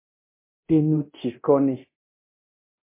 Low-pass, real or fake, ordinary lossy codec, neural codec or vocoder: 3.6 kHz; fake; MP3, 24 kbps; codec, 24 kHz, 0.9 kbps, DualCodec